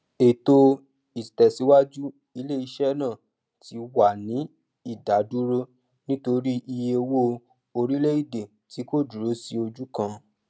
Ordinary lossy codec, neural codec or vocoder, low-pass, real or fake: none; none; none; real